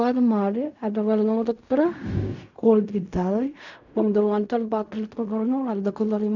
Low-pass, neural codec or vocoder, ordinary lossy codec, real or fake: 7.2 kHz; codec, 16 kHz in and 24 kHz out, 0.4 kbps, LongCat-Audio-Codec, fine tuned four codebook decoder; none; fake